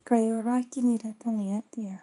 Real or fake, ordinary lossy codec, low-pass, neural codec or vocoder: fake; none; 10.8 kHz; codec, 24 kHz, 0.9 kbps, WavTokenizer, small release